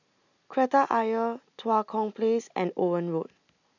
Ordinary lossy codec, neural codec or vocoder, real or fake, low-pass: none; none; real; 7.2 kHz